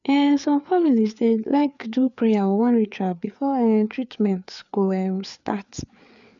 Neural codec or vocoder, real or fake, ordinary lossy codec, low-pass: codec, 16 kHz, 4 kbps, FreqCodec, larger model; fake; none; 7.2 kHz